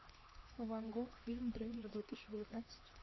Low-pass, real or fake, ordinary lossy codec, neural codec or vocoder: 7.2 kHz; fake; MP3, 24 kbps; codec, 16 kHz, 2 kbps, X-Codec, HuBERT features, trained on LibriSpeech